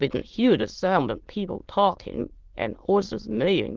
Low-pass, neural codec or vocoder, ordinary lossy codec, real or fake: 7.2 kHz; autoencoder, 22.05 kHz, a latent of 192 numbers a frame, VITS, trained on many speakers; Opus, 16 kbps; fake